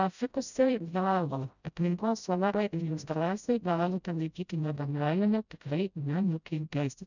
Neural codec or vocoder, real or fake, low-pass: codec, 16 kHz, 0.5 kbps, FreqCodec, smaller model; fake; 7.2 kHz